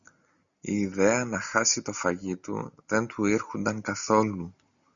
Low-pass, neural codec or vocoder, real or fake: 7.2 kHz; none; real